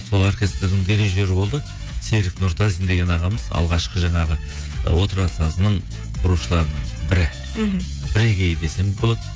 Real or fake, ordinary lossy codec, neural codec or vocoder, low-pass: fake; none; codec, 16 kHz, 16 kbps, FreqCodec, smaller model; none